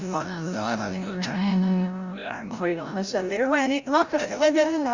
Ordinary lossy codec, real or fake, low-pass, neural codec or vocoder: Opus, 64 kbps; fake; 7.2 kHz; codec, 16 kHz, 0.5 kbps, FreqCodec, larger model